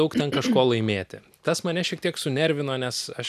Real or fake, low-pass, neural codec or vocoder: real; 14.4 kHz; none